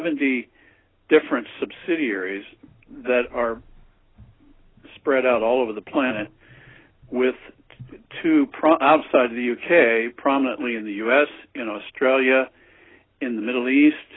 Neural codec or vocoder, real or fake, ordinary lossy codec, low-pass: none; real; AAC, 16 kbps; 7.2 kHz